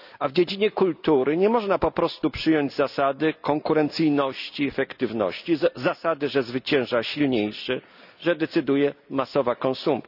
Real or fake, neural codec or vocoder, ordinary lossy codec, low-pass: real; none; none; 5.4 kHz